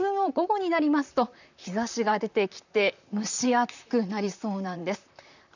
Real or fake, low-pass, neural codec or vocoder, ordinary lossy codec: fake; 7.2 kHz; vocoder, 44.1 kHz, 128 mel bands, Pupu-Vocoder; none